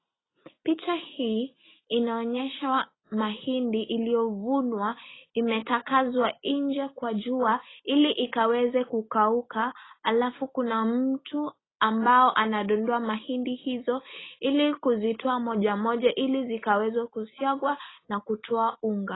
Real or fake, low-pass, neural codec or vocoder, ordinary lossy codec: real; 7.2 kHz; none; AAC, 16 kbps